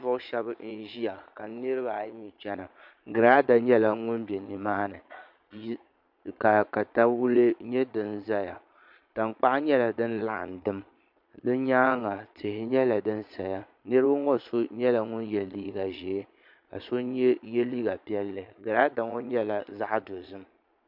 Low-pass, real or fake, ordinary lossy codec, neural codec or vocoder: 5.4 kHz; fake; MP3, 48 kbps; vocoder, 22.05 kHz, 80 mel bands, Vocos